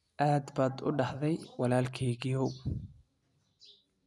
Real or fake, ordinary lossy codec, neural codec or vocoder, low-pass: real; none; none; none